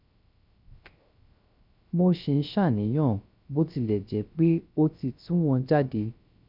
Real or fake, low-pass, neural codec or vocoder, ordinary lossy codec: fake; 5.4 kHz; codec, 16 kHz, 0.3 kbps, FocalCodec; none